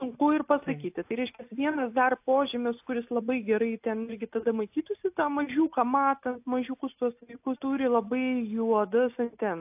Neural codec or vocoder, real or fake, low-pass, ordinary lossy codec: none; real; 3.6 kHz; AAC, 32 kbps